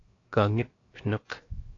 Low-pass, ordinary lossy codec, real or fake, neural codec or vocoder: 7.2 kHz; AAC, 32 kbps; fake; codec, 16 kHz, 0.7 kbps, FocalCodec